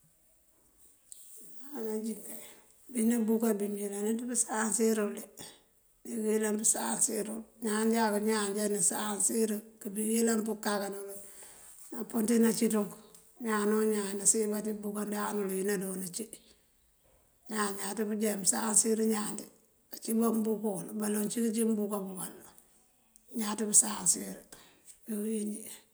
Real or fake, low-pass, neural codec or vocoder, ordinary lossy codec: real; none; none; none